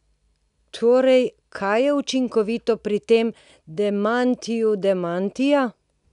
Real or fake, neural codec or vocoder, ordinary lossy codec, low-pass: real; none; none; 10.8 kHz